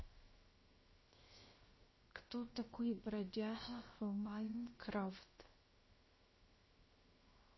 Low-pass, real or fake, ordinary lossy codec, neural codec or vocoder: 7.2 kHz; fake; MP3, 24 kbps; codec, 16 kHz, 0.7 kbps, FocalCodec